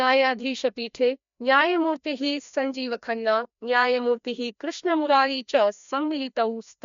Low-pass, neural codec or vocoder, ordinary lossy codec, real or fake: 7.2 kHz; codec, 16 kHz, 1 kbps, FreqCodec, larger model; MP3, 64 kbps; fake